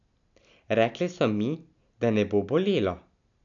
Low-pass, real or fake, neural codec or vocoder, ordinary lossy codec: 7.2 kHz; real; none; none